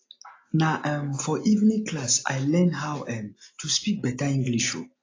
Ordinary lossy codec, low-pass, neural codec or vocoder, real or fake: AAC, 32 kbps; 7.2 kHz; none; real